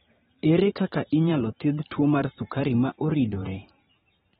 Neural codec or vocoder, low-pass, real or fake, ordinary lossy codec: vocoder, 44.1 kHz, 128 mel bands every 512 samples, BigVGAN v2; 19.8 kHz; fake; AAC, 16 kbps